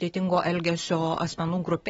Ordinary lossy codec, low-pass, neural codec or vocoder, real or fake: AAC, 24 kbps; 19.8 kHz; none; real